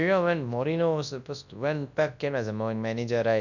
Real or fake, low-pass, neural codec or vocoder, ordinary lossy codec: fake; 7.2 kHz; codec, 24 kHz, 0.9 kbps, WavTokenizer, large speech release; none